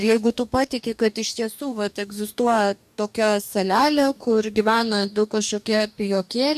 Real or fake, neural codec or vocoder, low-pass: fake; codec, 44.1 kHz, 2.6 kbps, DAC; 14.4 kHz